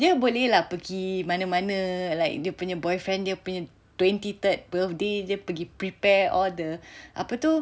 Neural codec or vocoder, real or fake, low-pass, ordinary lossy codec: none; real; none; none